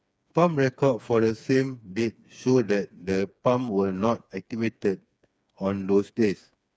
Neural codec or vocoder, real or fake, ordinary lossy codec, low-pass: codec, 16 kHz, 4 kbps, FreqCodec, smaller model; fake; none; none